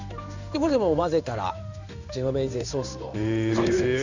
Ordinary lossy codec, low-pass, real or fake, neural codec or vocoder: none; 7.2 kHz; fake; codec, 16 kHz in and 24 kHz out, 1 kbps, XY-Tokenizer